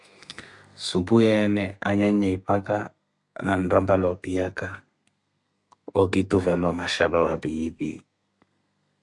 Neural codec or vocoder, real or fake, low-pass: codec, 32 kHz, 1.9 kbps, SNAC; fake; 10.8 kHz